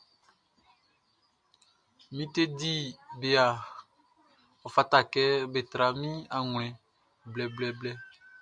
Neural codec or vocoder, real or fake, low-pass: none; real; 9.9 kHz